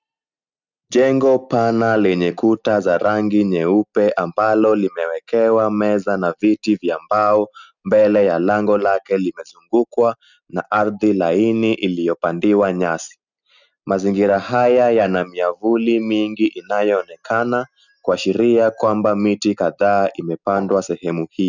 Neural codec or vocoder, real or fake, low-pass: none; real; 7.2 kHz